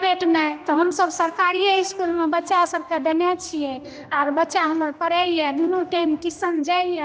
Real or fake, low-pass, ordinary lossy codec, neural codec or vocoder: fake; none; none; codec, 16 kHz, 1 kbps, X-Codec, HuBERT features, trained on general audio